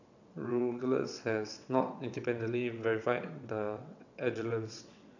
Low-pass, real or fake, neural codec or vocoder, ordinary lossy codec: 7.2 kHz; fake; vocoder, 22.05 kHz, 80 mel bands, WaveNeXt; none